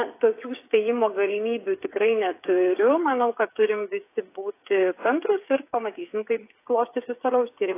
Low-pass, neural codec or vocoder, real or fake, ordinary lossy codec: 3.6 kHz; codec, 16 kHz, 8 kbps, FreqCodec, smaller model; fake; AAC, 24 kbps